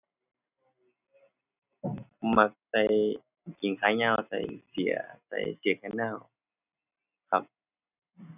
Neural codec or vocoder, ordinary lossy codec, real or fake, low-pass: none; none; real; 3.6 kHz